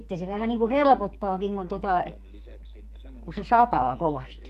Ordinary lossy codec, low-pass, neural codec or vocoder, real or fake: MP3, 96 kbps; 14.4 kHz; codec, 44.1 kHz, 2.6 kbps, SNAC; fake